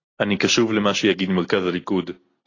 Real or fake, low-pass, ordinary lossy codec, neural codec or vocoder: real; 7.2 kHz; AAC, 32 kbps; none